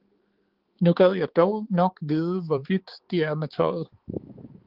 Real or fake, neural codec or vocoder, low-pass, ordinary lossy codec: fake; codec, 16 kHz, 4 kbps, X-Codec, HuBERT features, trained on balanced general audio; 5.4 kHz; Opus, 16 kbps